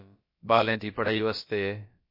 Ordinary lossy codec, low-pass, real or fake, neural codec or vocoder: MP3, 32 kbps; 5.4 kHz; fake; codec, 16 kHz, about 1 kbps, DyCAST, with the encoder's durations